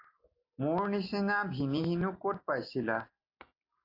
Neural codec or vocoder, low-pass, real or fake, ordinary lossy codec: none; 5.4 kHz; real; AAC, 32 kbps